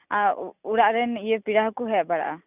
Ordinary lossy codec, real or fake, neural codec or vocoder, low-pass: none; real; none; 3.6 kHz